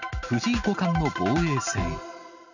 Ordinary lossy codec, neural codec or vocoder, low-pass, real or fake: none; none; 7.2 kHz; real